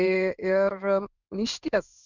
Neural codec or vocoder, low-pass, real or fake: codec, 16 kHz in and 24 kHz out, 1 kbps, XY-Tokenizer; 7.2 kHz; fake